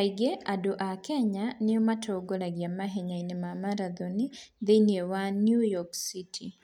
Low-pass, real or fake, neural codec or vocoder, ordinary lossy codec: 19.8 kHz; real; none; none